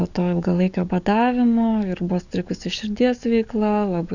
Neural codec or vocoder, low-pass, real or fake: codec, 44.1 kHz, 7.8 kbps, DAC; 7.2 kHz; fake